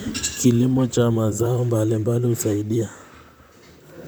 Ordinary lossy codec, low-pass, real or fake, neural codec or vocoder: none; none; fake; vocoder, 44.1 kHz, 128 mel bands, Pupu-Vocoder